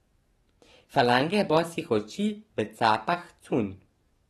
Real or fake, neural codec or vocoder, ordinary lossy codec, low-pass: fake; codec, 44.1 kHz, 7.8 kbps, DAC; AAC, 32 kbps; 19.8 kHz